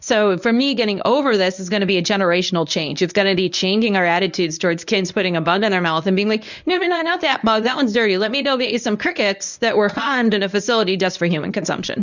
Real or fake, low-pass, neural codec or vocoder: fake; 7.2 kHz; codec, 24 kHz, 0.9 kbps, WavTokenizer, medium speech release version 1